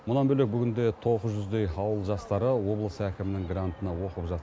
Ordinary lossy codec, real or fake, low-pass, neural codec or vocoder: none; real; none; none